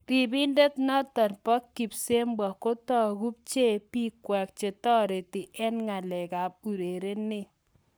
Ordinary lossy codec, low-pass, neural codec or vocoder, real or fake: none; none; codec, 44.1 kHz, 7.8 kbps, Pupu-Codec; fake